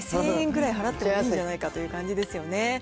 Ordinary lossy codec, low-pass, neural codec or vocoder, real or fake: none; none; none; real